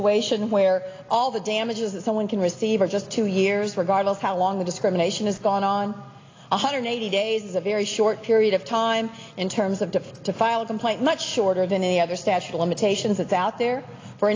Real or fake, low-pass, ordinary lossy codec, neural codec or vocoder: real; 7.2 kHz; AAC, 32 kbps; none